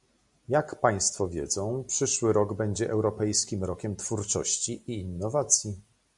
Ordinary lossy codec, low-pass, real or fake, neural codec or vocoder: MP3, 96 kbps; 10.8 kHz; real; none